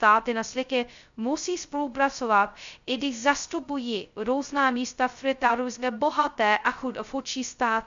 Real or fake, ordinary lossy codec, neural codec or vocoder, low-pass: fake; Opus, 64 kbps; codec, 16 kHz, 0.2 kbps, FocalCodec; 7.2 kHz